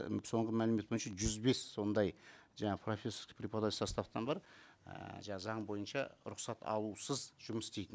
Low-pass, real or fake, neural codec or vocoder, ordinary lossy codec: none; real; none; none